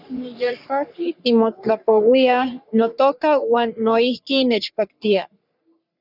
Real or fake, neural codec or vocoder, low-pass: fake; codec, 44.1 kHz, 3.4 kbps, Pupu-Codec; 5.4 kHz